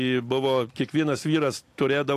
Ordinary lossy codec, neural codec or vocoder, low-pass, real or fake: AAC, 64 kbps; none; 14.4 kHz; real